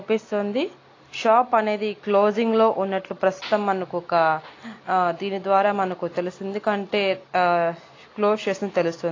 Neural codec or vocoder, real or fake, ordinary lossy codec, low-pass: none; real; AAC, 32 kbps; 7.2 kHz